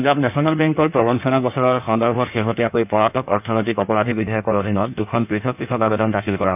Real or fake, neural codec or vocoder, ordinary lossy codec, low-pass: fake; codec, 16 kHz in and 24 kHz out, 1.1 kbps, FireRedTTS-2 codec; none; 3.6 kHz